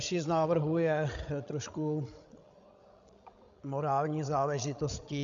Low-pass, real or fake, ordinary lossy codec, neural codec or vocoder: 7.2 kHz; fake; MP3, 96 kbps; codec, 16 kHz, 16 kbps, FreqCodec, larger model